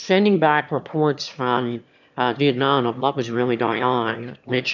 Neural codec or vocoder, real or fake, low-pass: autoencoder, 22.05 kHz, a latent of 192 numbers a frame, VITS, trained on one speaker; fake; 7.2 kHz